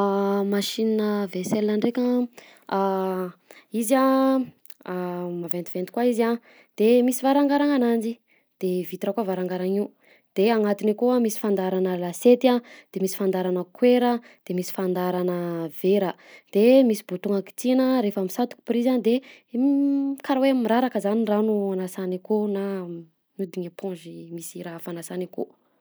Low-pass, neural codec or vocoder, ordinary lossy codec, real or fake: none; none; none; real